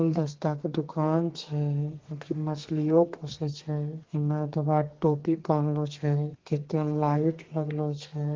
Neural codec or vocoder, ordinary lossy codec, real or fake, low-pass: codec, 32 kHz, 1.9 kbps, SNAC; Opus, 16 kbps; fake; 7.2 kHz